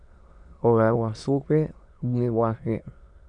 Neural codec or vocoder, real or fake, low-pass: autoencoder, 22.05 kHz, a latent of 192 numbers a frame, VITS, trained on many speakers; fake; 9.9 kHz